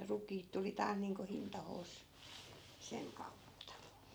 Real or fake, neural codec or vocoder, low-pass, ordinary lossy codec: real; none; none; none